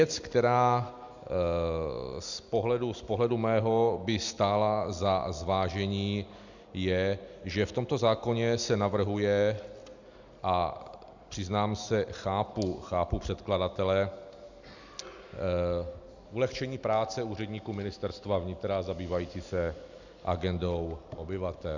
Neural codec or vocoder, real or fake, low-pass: none; real; 7.2 kHz